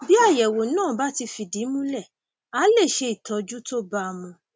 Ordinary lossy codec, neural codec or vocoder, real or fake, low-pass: none; none; real; none